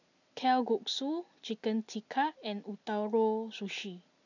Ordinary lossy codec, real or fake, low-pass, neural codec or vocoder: none; real; 7.2 kHz; none